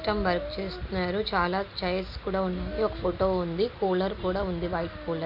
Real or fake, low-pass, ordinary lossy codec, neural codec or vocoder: real; 5.4 kHz; none; none